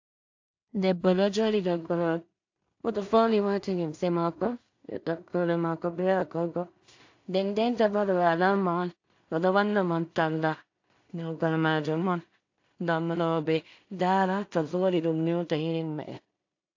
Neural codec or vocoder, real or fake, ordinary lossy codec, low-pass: codec, 16 kHz in and 24 kHz out, 0.4 kbps, LongCat-Audio-Codec, two codebook decoder; fake; AAC, 48 kbps; 7.2 kHz